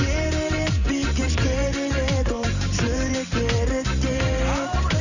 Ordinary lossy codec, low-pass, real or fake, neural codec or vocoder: none; 7.2 kHz; fake; vocoder, 44.1 kHz, 128 mel bands every 512 samples, BigVGAN v2